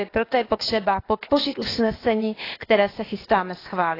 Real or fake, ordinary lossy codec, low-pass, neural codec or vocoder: fake; AAC, 24 kbps; 5.4 kHz; codec, 16 kHz, 0.8 kbps, ZipCodec